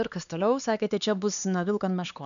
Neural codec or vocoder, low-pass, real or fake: codec, 16 kHz, 4 kbps, X-Codec, HuBERT features, trained on LibriSpeech; 7.2 kHz; fake